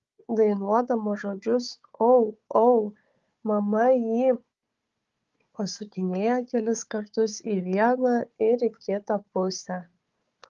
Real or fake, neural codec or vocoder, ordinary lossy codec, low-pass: fake; codec, 16 kHz, 4 kbps, FunCodec, trained on Chinese and English, 50 frames a second; Opus, 32 kbps; 7.2 kHz